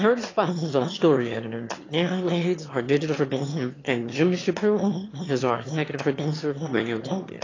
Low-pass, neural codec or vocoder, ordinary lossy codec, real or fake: 7.2 kHz; autoencoder, 22.05 kHz, a latent of 192 numbers a frame, VITS, trained on one speaker; AAC, 32 kbps; fake